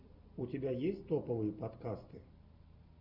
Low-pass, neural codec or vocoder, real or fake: 5.4 kHz; none; real